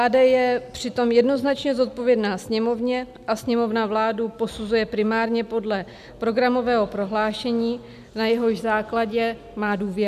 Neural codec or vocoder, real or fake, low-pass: none; real; 14.4 kHz